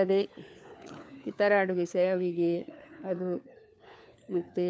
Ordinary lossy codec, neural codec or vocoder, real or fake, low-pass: none; codec, 16 kHz, 4 kbps, FunCodec, trained on LibriTTS, 50 frames a second; fake; none